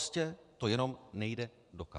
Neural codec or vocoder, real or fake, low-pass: vocoder, 24 kHz, 100 mel bands, Vocos; fake; 10.8 kHz